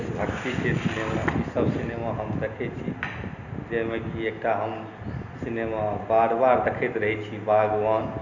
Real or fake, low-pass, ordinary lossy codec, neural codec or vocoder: real; 7.2 kHz; none; none